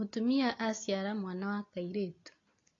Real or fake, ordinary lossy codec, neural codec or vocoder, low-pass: real; AAC, 32 kbps; none; 7.2 kHz